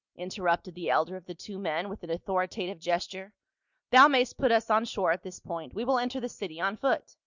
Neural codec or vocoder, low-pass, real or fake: none; 7.2 kHz; real